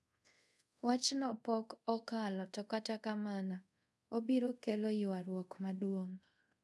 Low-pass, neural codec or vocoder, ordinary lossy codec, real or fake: none; codec, 24 kHz, 0.5 kbps, DualCodec; none; fake